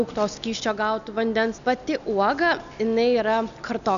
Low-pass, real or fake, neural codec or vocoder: 7.2 kHz; real; none